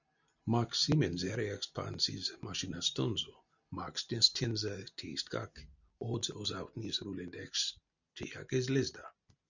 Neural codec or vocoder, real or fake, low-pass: none; real; 7.2 kHz